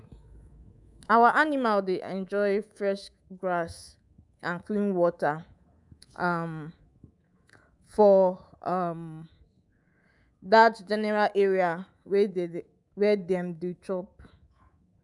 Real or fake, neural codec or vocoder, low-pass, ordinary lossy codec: fake; codec, 24 kHz, 3.1 kbps, DualCodec; 10.8 kHz; none